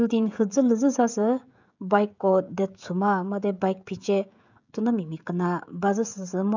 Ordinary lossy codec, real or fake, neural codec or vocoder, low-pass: none; fake; codec, 16 kHz, 16 kbps, FreqCodec, smaller model; 7.2 kHz